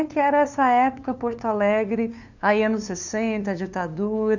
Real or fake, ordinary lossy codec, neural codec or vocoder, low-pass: fake; none; codec, 16 kHz, 2 kbps, FunCodec, trained on LibriTTS, 25 frames a second; 7.2 kHz